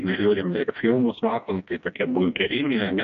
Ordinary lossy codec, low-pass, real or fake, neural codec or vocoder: MP3, 96 kbps; 7.2 kHz; fake; codec, 16 kHz, 1 kbps, FreqCodec, smaller model